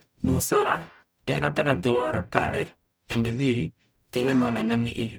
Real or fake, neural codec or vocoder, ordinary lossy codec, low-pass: fake; codec, 44.1 kHz, 0.9 kbps, DAC; none; none